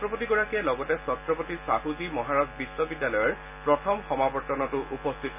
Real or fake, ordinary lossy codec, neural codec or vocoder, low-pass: real; none; none; 3.6 kHz